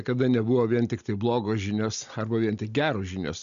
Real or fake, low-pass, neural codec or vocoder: real; 7.2 kHz; none